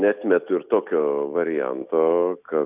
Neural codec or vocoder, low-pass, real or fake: none; 3.6 kHz; real